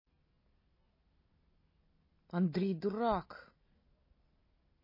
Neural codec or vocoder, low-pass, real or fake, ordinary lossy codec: none; 5.4 kHz; real; MP3, 24 kbps